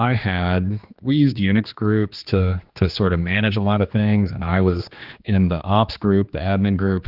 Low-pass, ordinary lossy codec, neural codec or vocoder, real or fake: 5.4 kHz; Opus, 32 kbps; codec, 16 kHz, 2 kbps, X-Codec, HuBERT features, trained on general audio; fake